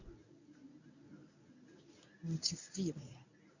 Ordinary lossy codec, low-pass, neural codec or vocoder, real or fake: none; 7.2 kHz; codec, 24 kHz, 0.9 kbps, WavTokenizer, medium speech release version 1; fake